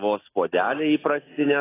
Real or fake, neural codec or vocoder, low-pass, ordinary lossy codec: real; none; 3.6 kHz; AAC, 16 kbps